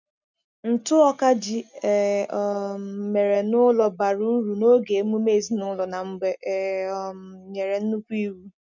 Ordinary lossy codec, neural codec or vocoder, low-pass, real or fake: none; none; 7.2 kHz; real